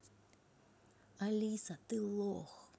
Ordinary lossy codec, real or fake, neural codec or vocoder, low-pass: none; real; none; none